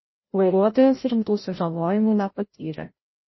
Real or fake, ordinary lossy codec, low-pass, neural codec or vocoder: fake; MP3, 24 kbps; 7.2 kHz; codec, 16 kHz, 0.5 kbps, FreqCodec, larger model